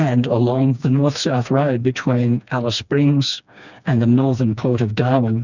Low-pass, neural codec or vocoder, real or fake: 7.2 kHz; codec, 16 kHz, 2 kbps, FreqCodec, smaller model; fake